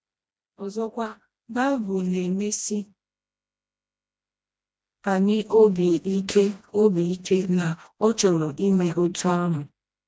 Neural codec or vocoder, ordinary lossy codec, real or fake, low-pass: codec, 16 kHz, 1 kbps, FreqCodec, smaller model; none; fake; none